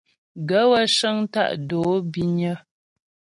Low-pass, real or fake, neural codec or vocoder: 10.8 kHz; real; none